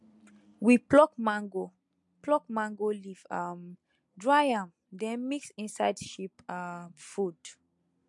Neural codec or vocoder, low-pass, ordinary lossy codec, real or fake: none; 10.8 kHz; MP3, 64 kbps; real